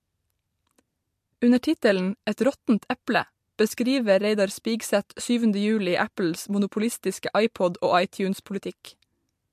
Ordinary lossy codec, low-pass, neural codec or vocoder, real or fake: MP3, 64 kbps; 14.4 kHz; none; real